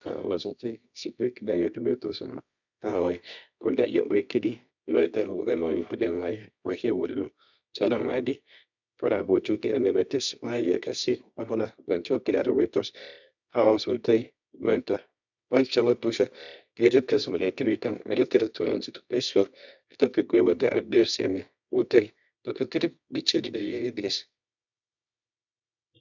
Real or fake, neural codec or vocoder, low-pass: fake; codec, 24 kHz, 0.9 kbps, WavTokenizer, medium music audio release; 7.2 kHz